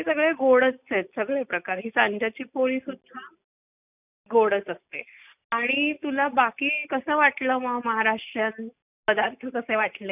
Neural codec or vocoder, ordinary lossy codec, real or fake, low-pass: none; none; real; 3.6 kHz